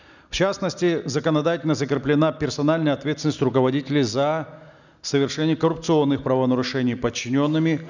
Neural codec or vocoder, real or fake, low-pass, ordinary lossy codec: none; real; 7.2 kHz; none